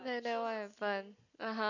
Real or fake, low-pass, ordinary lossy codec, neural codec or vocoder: real; 7.2 kHz; none; none